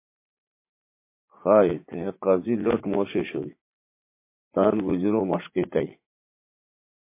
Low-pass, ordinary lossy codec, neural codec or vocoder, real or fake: 3.6 kHz; MP3, 24 kbps; vocoder, 44.1 kHz, 80 mel bands, Vocos; fake